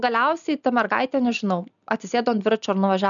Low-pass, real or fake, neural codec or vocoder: 7.2 kHz; real; none